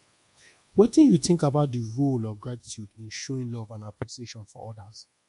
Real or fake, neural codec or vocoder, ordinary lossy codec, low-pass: fake; codec, 24 kHz, 1.2 kbps, DualCodec; MP3, 64 kbps; 10.8 kHz